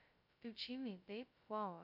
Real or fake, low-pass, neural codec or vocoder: fake; 5.4 kHz; codec, 16 kHz, 0.2 kbps, FocalCodec